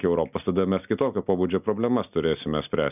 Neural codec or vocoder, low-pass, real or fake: none; 3.6 kHz; real